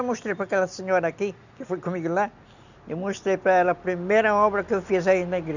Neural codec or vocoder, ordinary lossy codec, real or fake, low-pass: none; none; real; 7.2 kHz